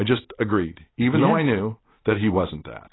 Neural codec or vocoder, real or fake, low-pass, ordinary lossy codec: none; real; 7.2 kHz; AAC, 16 kbps